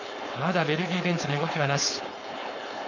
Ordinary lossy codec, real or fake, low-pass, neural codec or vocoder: none; fake; 7.2 kHz; codec, 16 kHz, 4.8 kbps, FACodec